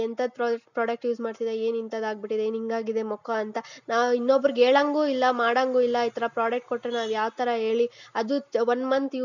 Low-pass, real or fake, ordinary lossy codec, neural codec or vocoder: 7.2 kHz; real; none; none